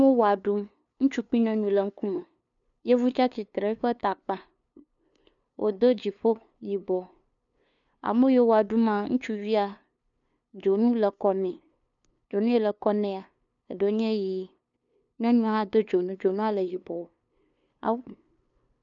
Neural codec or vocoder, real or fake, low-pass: codec, 16 kHz, 2 kbps, FunCodec, trained on LibriTTS, 25 frames a second; fake; 7.2 kHz